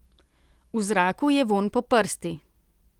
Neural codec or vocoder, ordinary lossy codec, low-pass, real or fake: none; Opus, 24 kbps; 19.8 kHz; real